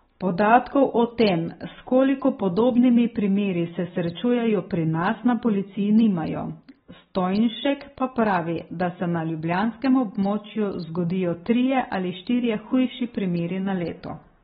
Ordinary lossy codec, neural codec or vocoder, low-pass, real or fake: AAC, 16 kbps; none; 19.8 kHz; real